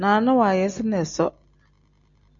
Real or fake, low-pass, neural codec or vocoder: real; 7.2 kHz; none